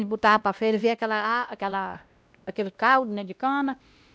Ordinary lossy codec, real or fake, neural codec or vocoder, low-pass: none; fake; codec, 16 kHz, 1 kbps, X-Codec, WavLM features, trained on Multilingual LibriSpeech; none